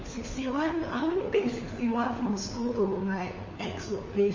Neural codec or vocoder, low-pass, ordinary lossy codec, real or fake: codec, 16 kHz, 4 kbps, FunCodec, trained on LibriTTS, 50 frames a second; 7.2 kHz; MP3, 32 kbps; fake